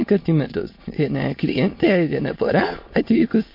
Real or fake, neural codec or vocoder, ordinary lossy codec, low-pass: fake; autoencoder, 22.05 kHz, a latent of 192 numbers a frame, VITS, trained on many speakers; MP3, 32 kbps; 5.4 kHz